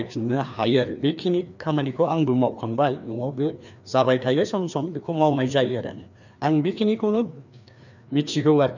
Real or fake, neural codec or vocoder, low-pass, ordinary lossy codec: fake; codec, 16 kHz, 2 kbps, FreqCodec, larger model; 7.2 kHz; none